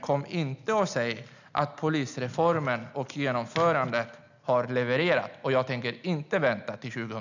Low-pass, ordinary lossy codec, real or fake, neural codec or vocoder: 7.2 kHz; none; real; none